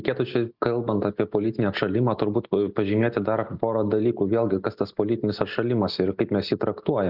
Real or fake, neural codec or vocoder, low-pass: real; none; 5.4 kHz